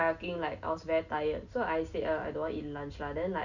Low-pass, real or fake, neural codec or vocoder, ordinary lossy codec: 7.2 kHz; real; none; none